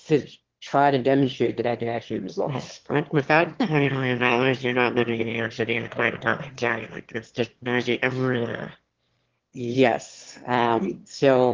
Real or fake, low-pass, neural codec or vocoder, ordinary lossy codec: fake; 7.2 kHz; autoencoder, 22.05 kHz, a latent of 192 numbers a frame, VITS, trained on one speaker; Opus, 16 kbps